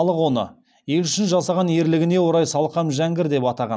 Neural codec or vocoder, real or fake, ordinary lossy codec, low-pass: none; real; none; none